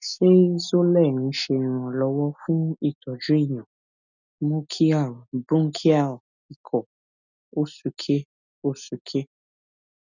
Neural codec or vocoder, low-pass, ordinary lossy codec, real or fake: none; 7.2 kHz; none; real